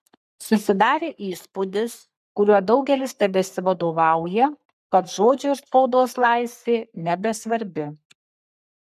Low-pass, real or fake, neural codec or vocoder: 14.4 kHz; fake; codec, 44.1 kHz, 3.4 kbps, Pupu-Codec